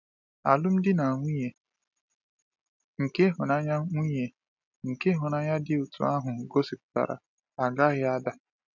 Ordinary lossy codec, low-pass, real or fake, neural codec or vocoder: none; 7.2 kHz; real; none